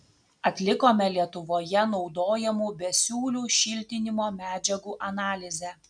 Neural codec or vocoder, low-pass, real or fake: none; 9.9 kHz; real